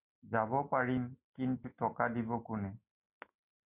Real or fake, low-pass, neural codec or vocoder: real; 3.6 kHz; none